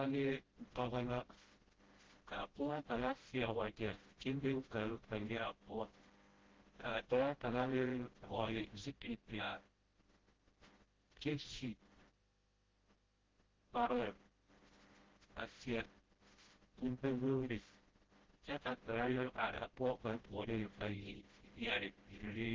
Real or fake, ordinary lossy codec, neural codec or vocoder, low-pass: fake; Opus, 16 kbps; codec, 16 kHz, 0.5 kbps, FreqCodec, smaller model; 7.2 kHz